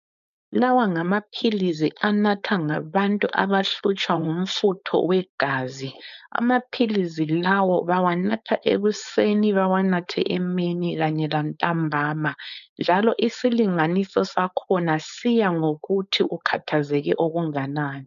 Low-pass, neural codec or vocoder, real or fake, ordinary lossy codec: 7.2 kHz; codec, 16 kHz, 4.8 kbps, FACodec; fake; MP3, 96 kbps